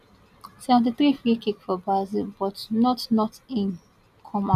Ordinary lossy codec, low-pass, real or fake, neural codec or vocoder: none; 14.4 kHz; real; none